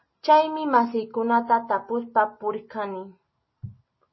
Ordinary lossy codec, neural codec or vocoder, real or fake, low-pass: MP3, 24 kbps; none; real; 7.2 kHz